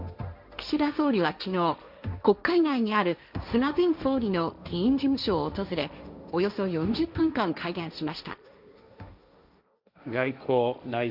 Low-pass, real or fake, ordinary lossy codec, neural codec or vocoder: 5.4 kHz; fake; none; codec, 16 kHz, 1.1 kbps, Voila-Tokenizer